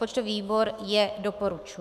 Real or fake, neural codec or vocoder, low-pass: fake; autoencoder, 48 kHz, 128 numbers a frame, DAC-VAE, trained on Japanese speech; 14.4 kHz